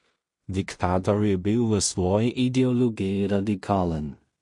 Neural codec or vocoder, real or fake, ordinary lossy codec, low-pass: codec, 16 kHz in and 24 kHz out, 0.4 kbps, LongCat-Audio-Codec, two codebook decoder; fake; MP3, 48 kbps; 10.8 kHz